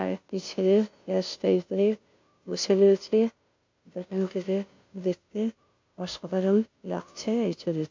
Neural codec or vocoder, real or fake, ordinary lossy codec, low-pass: codec, 16 kHz, 0.5 kbps, FunCodec, trained on Chinese and English, 25 frames a second; fake; MP3, 48 kbps; 7.2 kHz